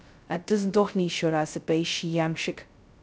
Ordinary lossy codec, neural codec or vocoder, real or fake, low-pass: none; codec, 16 kHz, 0.2 kbps, FocalCodec; fake; none